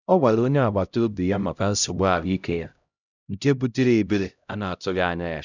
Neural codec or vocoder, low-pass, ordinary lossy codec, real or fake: codec, 16 kHz, 0.5 kbps, X-Codec, HuBERT features, trained on LibriSpeech; 7.2 kHz; none; fake